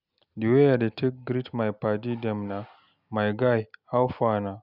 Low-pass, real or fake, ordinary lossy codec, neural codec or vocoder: 5.4 kHz; real; none; none